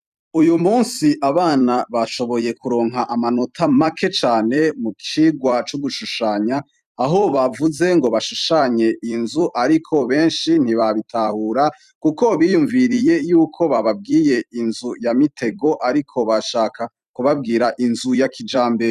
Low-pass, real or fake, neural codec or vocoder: 14.4 kHz; fake; vocoder, 44.1 kHz, 128 mel bands every 256 samples, BigVGAN v2